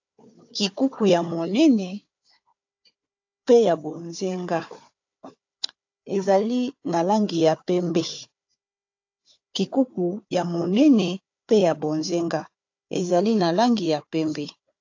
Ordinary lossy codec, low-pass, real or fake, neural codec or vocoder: AAC, 48 kbps; 7.2 kHz; fake; codec, 16 kHz, 4 kbps, FunCodec, trained on Chinese and English, 50 frames a second